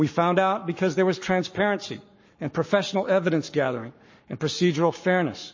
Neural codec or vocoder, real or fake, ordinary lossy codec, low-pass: codec, 44.1 kHz, 7.8 kbps, Pupu-Codec; fake; MP3, 32 kbps; 7.2 kHz